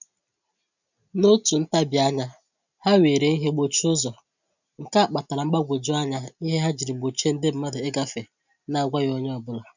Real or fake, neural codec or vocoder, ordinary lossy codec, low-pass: real; none; none; 7.2 kHz